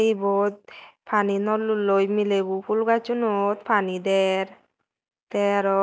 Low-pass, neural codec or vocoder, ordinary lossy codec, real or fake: none; none; none; real